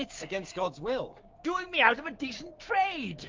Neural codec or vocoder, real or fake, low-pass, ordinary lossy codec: codec, 16 kHz, 6 kbps, DAC; fake; 7.2 kHz; Opus, 32 kbps